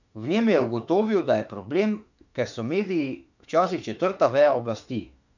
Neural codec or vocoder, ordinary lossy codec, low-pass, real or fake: autoencoder, 48 kHz, 32 numbers a frame, DAC-VAE, trained on Japanese speech; none; 7.2 kHz; fake